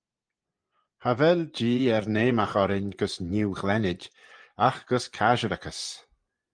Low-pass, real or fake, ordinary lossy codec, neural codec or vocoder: 9.9 kHz; fake; Opus, 24 kbps; vocoder, 24 kHz, 100 mel bands, Vocos